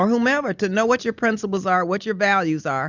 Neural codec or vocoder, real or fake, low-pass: none; real; 7.2 kHz